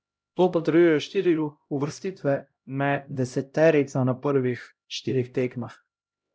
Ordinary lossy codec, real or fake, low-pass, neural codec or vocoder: none; fake; none; codec, 16 kHz, 0.5 kbps, X-Codec, HuBERT features, trained on LibriSpeech